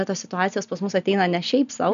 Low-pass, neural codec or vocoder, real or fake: 7.2 kHz; none; real